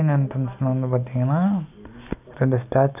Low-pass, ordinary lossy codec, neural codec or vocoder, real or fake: 3.6 kHz; none; none; real